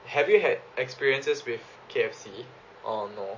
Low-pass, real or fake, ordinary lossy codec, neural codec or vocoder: 7.2 kHz; real; MP3, 32 kbps; none